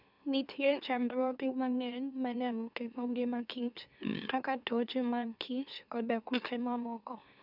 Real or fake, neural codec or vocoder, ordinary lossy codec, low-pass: fake; autoencoder, 44.1 kHz, a latent of 192 numbers a frame, MeloTTS; none; 5.4 kHz